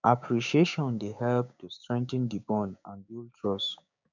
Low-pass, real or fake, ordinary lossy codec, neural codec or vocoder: 7.2 kHz; fake; none; codec, 16 kHz, 6 kbps, DAC